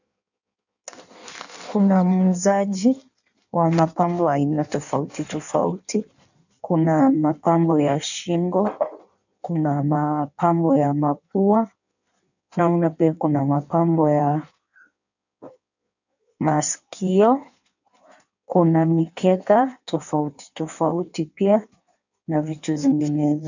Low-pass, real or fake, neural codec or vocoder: 7.2 kHz; fake; codec, 16 kHz in and 24 kHz out, 1.1 kbps, FireRedTTS-2 codec